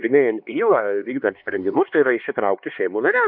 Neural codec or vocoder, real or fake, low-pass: codec, 16 kHz, 2 kbps, X-Codec, HuBERT features, trained on LibriSpeech; fake; 5.4 kHz